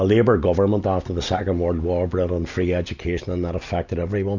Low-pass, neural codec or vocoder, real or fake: 7.2 kHz; none; real